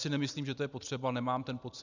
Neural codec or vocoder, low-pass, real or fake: none; 7.2 kHz; real